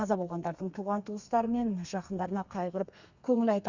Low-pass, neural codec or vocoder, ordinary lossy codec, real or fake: 7.2 kHz; codec, 32 kHz, 1.9 kbps, SNAC; Opus, 64 kbps; fake